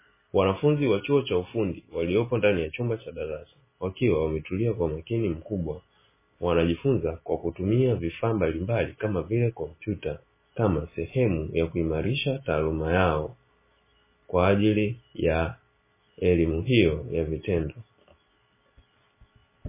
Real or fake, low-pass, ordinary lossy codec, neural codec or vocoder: real; 3.6 kHz; MP3, 16 kbps; none